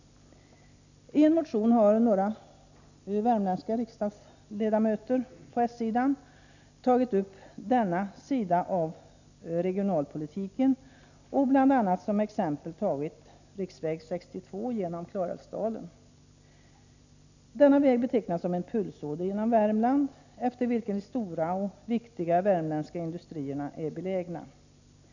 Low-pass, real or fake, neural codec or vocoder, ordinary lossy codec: 7.2 kHz; real; none; none